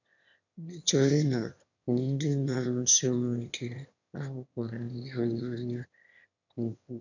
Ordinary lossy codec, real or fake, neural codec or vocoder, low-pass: none; fake; autoencoder, 22.05 kHz, a latent of 192 numbers a frame, VITS, trained on one speaker; 7.2 kHz